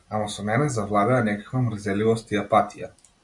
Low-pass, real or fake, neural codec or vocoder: 10.8 kHz; real; none